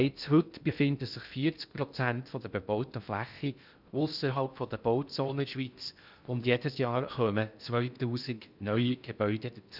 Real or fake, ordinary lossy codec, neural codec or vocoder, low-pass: fake; none; codec, 16 kHz in and 24 kHz out, 0.6 kbps, FocalCodec, streaming, 2048 codes; 5.4 kHz